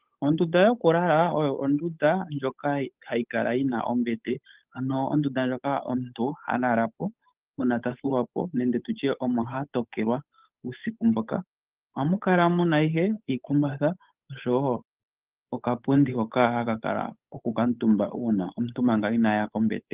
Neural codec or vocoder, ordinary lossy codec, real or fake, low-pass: codec, 16 kHz, 8 kbps, FunCodec, trained on Chinese and English, 25 frames a second; Opus, 24 kbps; fake; 3.6 kHz